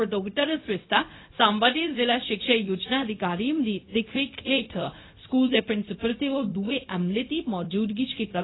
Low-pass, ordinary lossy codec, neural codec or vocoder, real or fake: 7.2 kHz; AAC, 16 kbps; codec, 16 kHz, 0.4 kbps, LongCat-Audio-Codec; fake